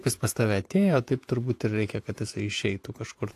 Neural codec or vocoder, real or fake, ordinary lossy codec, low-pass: vocoder, 48 kHz, 128 mel bands, Vocos; fake; AAC, 64 kbps; 14.4 kHz